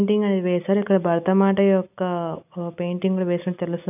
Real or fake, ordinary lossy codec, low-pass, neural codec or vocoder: real; none; 3.6 kHz; none